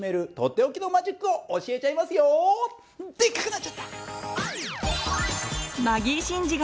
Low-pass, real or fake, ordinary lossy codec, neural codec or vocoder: none; real; none; none